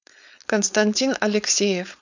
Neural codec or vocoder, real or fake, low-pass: codec, 16 kHz, 4.8 kbps, FACodec; fake; 7.2 kHz